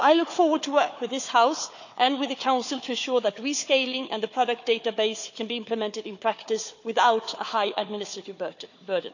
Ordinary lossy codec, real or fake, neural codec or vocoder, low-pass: none; fake; codec, 16 kHz, 4 kbps, FunCodec, trained on Chinese and English, 50 frames a second; 7.2 kHz